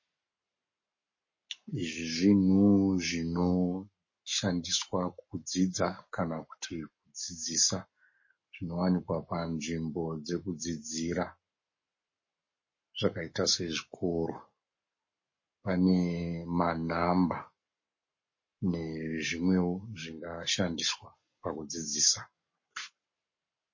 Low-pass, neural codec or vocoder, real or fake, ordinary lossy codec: 7.2 kHz; codec, 44.1 kHz, 7.8 kbps, Pupu-Codec; fake; MP3, 32 kbps